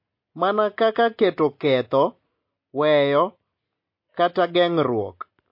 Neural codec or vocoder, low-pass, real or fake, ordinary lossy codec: none; 5.4 kHz; real; MP3, 32 kbps